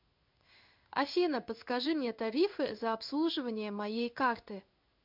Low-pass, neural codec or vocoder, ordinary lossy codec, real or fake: 5.4 kHz; codec, 16 kHz in and 24 kHz out, 1 kbps, XY-Tokenizer; MP3, 48 kbps; fake